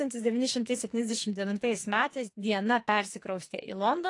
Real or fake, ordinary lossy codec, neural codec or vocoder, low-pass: fake; AAC, 48 kbps; codec, 44.1 kHz, 2.6 kbps, SNAC; 10.8 kHz